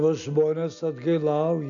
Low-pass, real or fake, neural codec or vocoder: 7.2 kHz; real; none